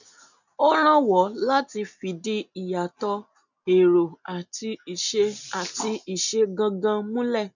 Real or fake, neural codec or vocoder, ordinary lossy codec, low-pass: real; none; none; 7.2 kHz